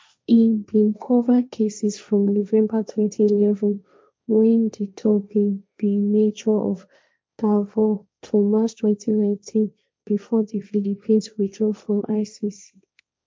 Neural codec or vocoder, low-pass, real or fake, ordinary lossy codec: codec, 16 kHz, 1.1 kbps, Voila-Tokenizer; none; fake; none